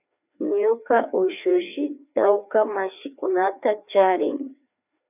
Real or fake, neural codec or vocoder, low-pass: fake; codec, 16 kHz, 2 kbps, FreqCodec, larger model; 3.6 kHz